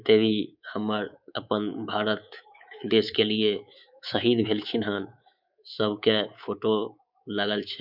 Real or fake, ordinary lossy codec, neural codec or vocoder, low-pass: fake; none; codec, 24 kHz, 3.1 kbps, DualCodec; 5.4 kHz